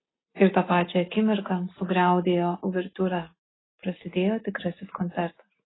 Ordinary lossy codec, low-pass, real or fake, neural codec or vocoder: AAC, 16 kbps; 7.2 kHz; real; none